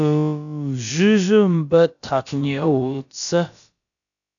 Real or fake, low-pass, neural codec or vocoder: fake; 7.2 kHz; codec, 16 kHz, about 1 kbps, DyCAST, with the encoder's durations